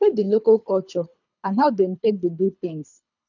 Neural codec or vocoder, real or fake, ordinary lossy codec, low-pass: codec, 24 kHz, 3 kbps, HILCodec; fake; none; 7.2 kHz